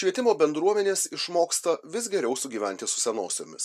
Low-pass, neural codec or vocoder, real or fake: 14.4 kHz; none; real